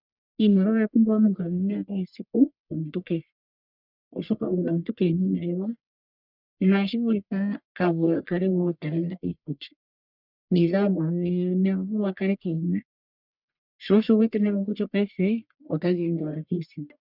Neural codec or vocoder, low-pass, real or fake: codec, 44.1 kHz, 1.7 kbps, Pupu-Codec; 5.4 kHz; fake